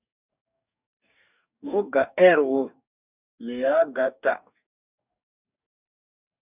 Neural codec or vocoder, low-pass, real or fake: codec, 44.1 kHz, 2.6 kbps, DAC; 3.6 kHz; fake